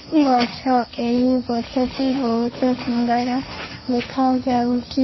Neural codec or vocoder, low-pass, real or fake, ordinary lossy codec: codec, 16 kHz, 1.1 kbps, Voila-Tokenizer; 7.2 kHz; fake; MP3, 24 kbps